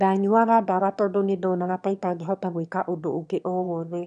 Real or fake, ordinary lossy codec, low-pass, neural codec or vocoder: fake; none; 9.9 kHz; autoencoder, 22.05 kHz, a latent of 192 numbers a frame, VITS, trained on one speaker